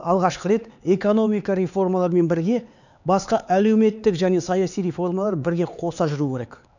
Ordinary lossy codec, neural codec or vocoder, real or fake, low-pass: none; codec, 16 kHz, 4 kbps, X-Codec, HuBERT features, trained on LibriSpeech; fake; 7.2 kHz